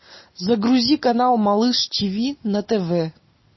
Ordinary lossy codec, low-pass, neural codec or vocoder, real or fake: MP3, 24 kbps; 7.2 kHz; none; real